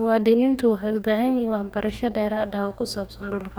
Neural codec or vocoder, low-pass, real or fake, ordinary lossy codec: codec, 44.1 kHz, 2.6 kbps, DAC; none; fake; none